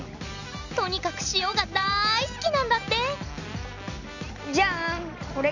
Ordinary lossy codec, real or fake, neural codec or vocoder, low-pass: none; real; none; 7.2 kHz